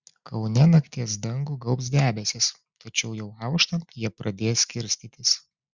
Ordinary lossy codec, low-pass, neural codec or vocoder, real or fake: Opus, 64 kbps; 7.2 kHz; none; real